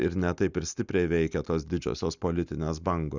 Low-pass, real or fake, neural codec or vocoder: 7.2 kHz; real; none